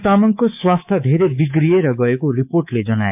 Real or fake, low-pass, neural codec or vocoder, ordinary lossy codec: fake; 3.6 kHz; codec, 24 kHz, 3.1 kbps, DualCodec; AAC, 32 kbps